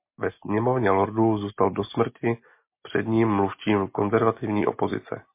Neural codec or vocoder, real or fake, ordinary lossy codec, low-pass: none; real; MP3, 24 kbps; 3.6 kHz